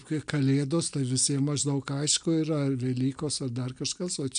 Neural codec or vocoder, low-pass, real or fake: none; 9.9 kHz; real